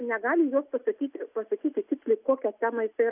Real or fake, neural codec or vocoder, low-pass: real; none; 3.6 kHz